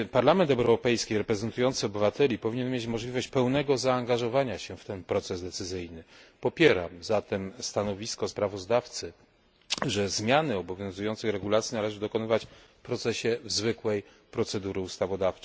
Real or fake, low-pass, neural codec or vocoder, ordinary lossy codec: real; none; none; none